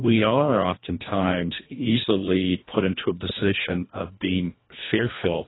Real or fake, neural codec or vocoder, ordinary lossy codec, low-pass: fake; codec, 24 kHz, 1.5 kbps, HILCodec; AAC, 16 kbps; 7.2 kHz